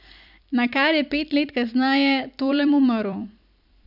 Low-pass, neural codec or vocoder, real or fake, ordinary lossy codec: 5.4 kHz; vocoder, 44.1 kHz, 128 mel bands every 256 samples, BigVGAN v2; fake; none